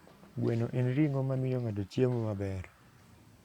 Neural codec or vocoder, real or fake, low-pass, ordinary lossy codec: vocoder, 44.1 kHz, 128 mel bands every 512 samples, BigVGAN v2; fake; 19.8 kHz; Opus, 64 kbps